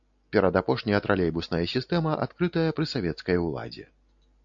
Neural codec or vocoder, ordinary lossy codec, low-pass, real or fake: none; AAC, 64 kbps; 7.2 kHz; real